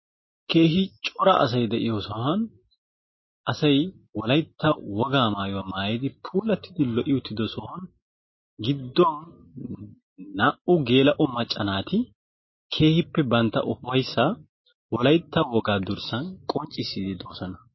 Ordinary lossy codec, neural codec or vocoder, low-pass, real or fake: MP3, 24 kbps; none; 7.2 kHz; real